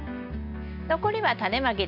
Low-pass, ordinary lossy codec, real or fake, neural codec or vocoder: 5.4 kHz; none; real; none